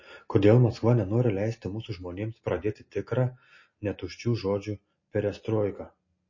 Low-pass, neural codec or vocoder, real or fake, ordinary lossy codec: 7.2 kHz; none; real; MP3, 32 kbps